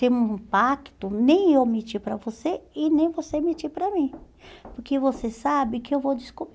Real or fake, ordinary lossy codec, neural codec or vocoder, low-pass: real; none; none; none